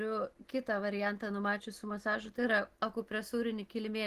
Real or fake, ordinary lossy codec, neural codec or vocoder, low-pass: real; Opus, 16 kbps; none; 14.4 kHz